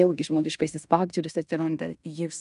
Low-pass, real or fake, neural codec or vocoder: 10.8 kHz; fake; codec, 16 kHz in and 24 kHz out, 0.9 kbps, LongCat-Audio-Codec, fine tuned four codebook decoder